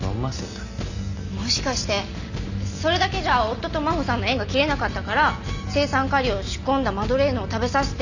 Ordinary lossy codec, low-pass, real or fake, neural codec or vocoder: none; 7.2 kHz; real; none